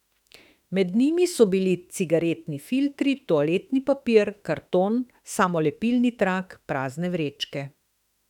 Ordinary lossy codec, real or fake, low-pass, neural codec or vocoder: none; fake; 19.8 kHz; autoencoder, 48 kHz, 32 numbers a frame, DAC-VAE, trained on Japanese speech